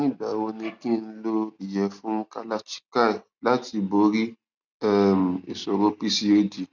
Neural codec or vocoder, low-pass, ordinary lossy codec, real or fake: none; 7.2 kHz; none; real